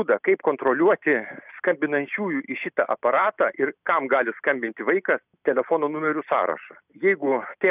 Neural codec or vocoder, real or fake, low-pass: none; real; 3.6 kHz